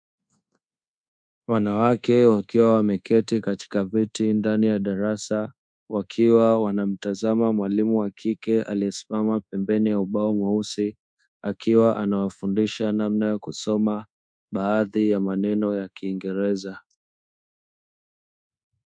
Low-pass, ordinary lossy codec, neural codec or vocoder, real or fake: 9.9 kHz; MP3, 64 kbps; codec, 24 kHz, 1.2 kbps, DualCodec; fake